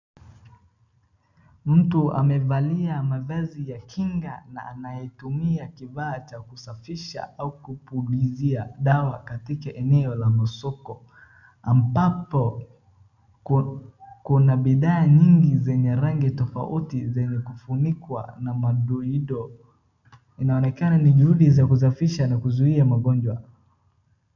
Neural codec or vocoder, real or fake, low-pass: none; real; 7.2 kHz